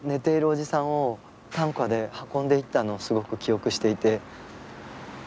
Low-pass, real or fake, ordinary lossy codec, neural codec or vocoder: none; real; none; none